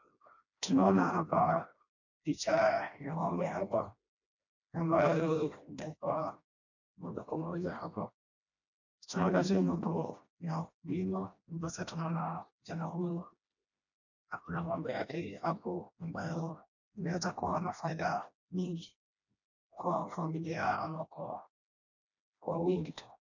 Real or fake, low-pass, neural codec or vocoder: fake; 7.2 kHz; codec, 16 kHz, 1 kbps, FreqCodec, smaller model